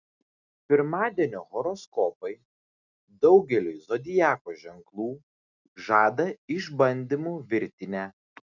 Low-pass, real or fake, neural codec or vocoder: 7.2 kHz; real; none